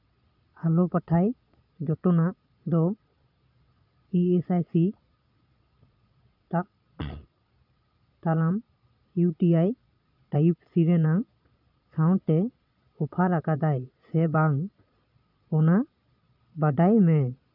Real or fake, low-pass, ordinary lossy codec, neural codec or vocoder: fake; 5.4 kHz; none; vocoder, 22.05 kHz, 80 mel bands, Vocos